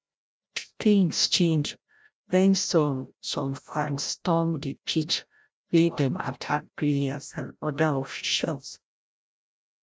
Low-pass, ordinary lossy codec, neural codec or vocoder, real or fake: none; none; codec, 16 kHz, 0.5 kbps, FreqCodec, larger model; fake